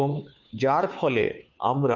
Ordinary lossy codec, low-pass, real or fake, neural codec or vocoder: none; 7.2 kHz; fake; codec, 16 kHz, 2 kbps, FunCodec, trained on Chinese and English, 25 frames a second